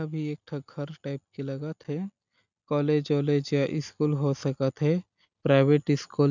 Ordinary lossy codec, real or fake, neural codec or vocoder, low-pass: none; real; none; 7.2 kHz